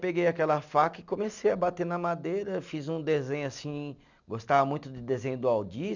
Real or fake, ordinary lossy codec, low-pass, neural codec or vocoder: real; none; 7.2 kHz; none